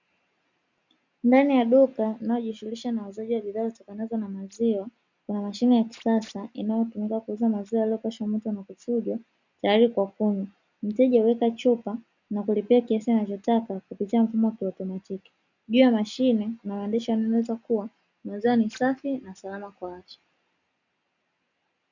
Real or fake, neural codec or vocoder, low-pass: real; none; 7.2 kHz